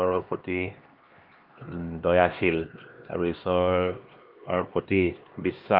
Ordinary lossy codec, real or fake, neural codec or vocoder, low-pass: Opus, 24 kbps; fake; codec, 16 kHz, 2 kbps, X-Codec, HuBERT features, trained on LibriSpeech; 5.4 kHz